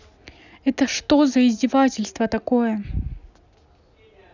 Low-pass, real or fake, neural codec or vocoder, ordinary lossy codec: 7.2 kHz; real; none; none